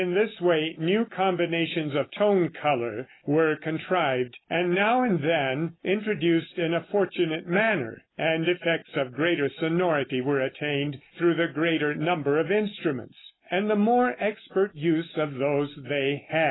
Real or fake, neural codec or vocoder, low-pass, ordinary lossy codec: real; none; 7.2 kHz; AAC, 16 kbps